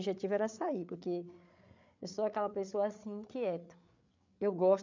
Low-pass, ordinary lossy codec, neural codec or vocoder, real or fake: 7.2 kHz; MP3, 64 kbps; codec, 16 kHz, 8 kbps, FreqCodec, larger model; fake